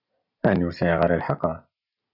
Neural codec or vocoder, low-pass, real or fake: none; 5.4 kHz; real